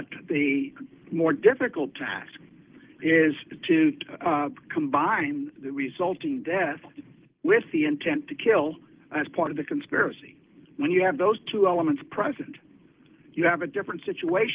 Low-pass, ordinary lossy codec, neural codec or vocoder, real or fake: 3.6 kHz; Opus, 16 kbps; none; real